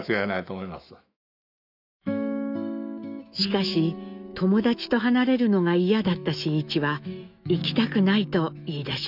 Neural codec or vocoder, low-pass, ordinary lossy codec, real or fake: autoencoder, 48 kHz, 128 numbers a frame, DAC-VAE, trained on Japanese speech; 5.4 kHz; none; fake